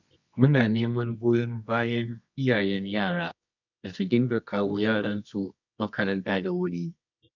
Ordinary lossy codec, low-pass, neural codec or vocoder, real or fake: none; 7.2 kHz; codec, 24 kHz, 0.9 kbps, WavTokenizer, medium music audio release; fake